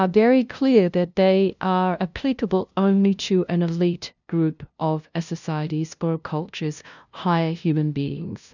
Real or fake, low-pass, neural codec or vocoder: fake; 7.2 kHz; codec, 16 kHz, 0.5 kbps, FunCodec, trained on LibriTTS, 25 frames a second